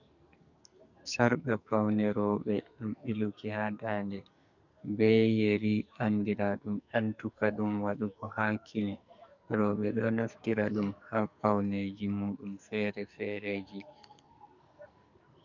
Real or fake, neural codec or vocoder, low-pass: fake; codec, 44.1 kHz, 2.6 kbps, SNAC; 7.2 kHz